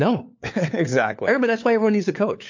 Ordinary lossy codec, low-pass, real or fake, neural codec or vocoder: MP3, 64 kbps; 7.2 kHz; fake; codec, 16 kHz, 4 kbps, FunCodec, trained on LibriTTS, 50 frames a second